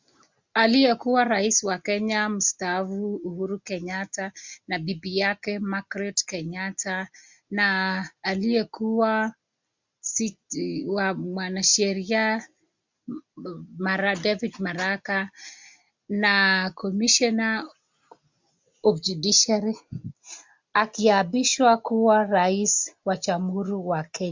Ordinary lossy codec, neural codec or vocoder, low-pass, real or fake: MP3, 64 kbps; none; 7.2 kHz; real